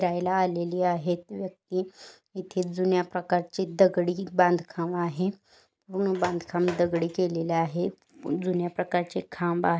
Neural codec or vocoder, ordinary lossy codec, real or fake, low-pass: none; none; real; none